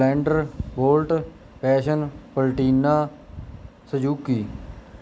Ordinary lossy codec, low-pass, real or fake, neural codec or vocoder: none; none; real; none